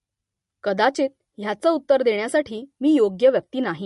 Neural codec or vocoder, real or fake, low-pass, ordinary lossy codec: none; real; 14.4 kHz; MP3, 48 kbps